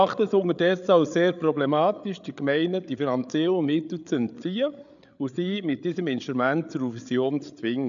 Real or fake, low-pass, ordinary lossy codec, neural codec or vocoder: fake; 7.2 kHz; none; codec, 16 kHz, 8 kbps, FreqCodec, larger model